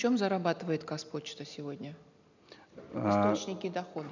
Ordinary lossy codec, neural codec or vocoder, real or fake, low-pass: none; none; real; 7.2 kHz